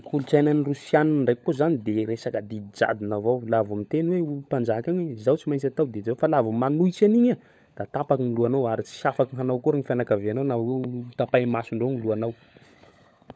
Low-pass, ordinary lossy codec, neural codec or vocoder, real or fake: none; none; codec, 16 kHz, 16 kbps, FunCodec, trained on LibriTTS, 50 frames a second; fake